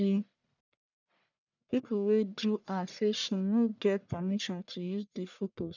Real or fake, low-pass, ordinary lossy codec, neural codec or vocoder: fake; 7.2 kHz; none; codec, 44.1 kHz, 1.7 kbps, Pupu-Codec